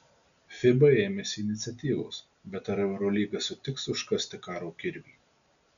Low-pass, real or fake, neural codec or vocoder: 7.2 kHz; real; none